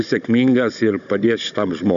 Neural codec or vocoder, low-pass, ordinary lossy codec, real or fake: codec, 16 kHz, 16 kbps, FunCodec, trained on Chinese and English, 50 frames a second; 7.2 kHz; MP3, 96 kbps; fake